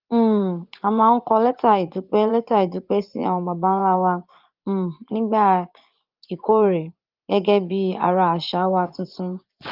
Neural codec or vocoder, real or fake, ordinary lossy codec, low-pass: vocoder, 24 kHz, 100 mel bands, Vocos; fake; Opus, 32 kbps; 5.4 kHz